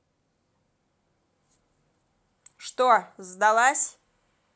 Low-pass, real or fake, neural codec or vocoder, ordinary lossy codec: none; real; none; none